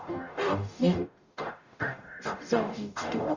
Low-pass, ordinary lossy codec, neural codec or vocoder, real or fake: 7.2 kHz; Opus, 64 kbps; codec, 44.1 kHz, 0.9 kbps, DAC; fake